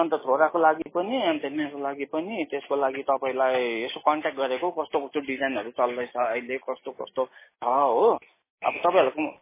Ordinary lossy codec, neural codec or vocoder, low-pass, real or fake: MP3, 16 kbps; none; 3.6 kHz; real